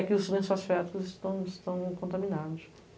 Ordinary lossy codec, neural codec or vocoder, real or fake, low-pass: none; none; real; none